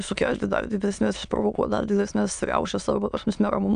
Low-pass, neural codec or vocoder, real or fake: 9.9 kHz; autoencoder, 22.05 kHz, a latent of 192 numbers a frame, VITS, trained on many speakers; fake